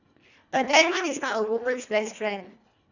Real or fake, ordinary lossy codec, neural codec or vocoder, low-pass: fake; none; codec, 24 kHz, 1.5 kbps, HILCodec; 7.2 kHz